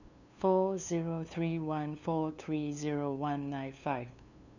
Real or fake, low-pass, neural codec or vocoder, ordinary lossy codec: fake; 7.2 kHz; codec, 16 kHz, 2 kbps, FunCodec, trained on LibriTTS, 25 frames a second; none